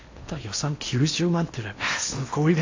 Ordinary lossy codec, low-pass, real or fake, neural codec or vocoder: AAC, 48 kbps; 7.2 kHz; fake; codec, 16 kHz in and 24 kHz out, 0.8 kbps, FocalCodec, streaming, 65536 codes